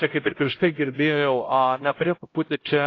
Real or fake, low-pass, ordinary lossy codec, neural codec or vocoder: fake; 7.2 kHz; AAC, 32 kbps; codec, 16 kHz, 0.5 kbps, X-Codec, HuBERT features, trained on LibriSpeech